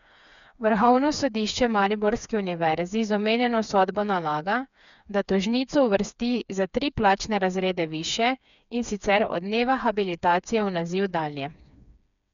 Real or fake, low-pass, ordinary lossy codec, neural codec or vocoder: fake; 7.2 kHz; none; codec, 16 kHz, 4 kbps, FreqCodec, smaller model